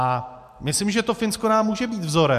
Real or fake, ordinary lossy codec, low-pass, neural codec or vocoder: real; MP3, 96 kbps; 14.4 kHz; none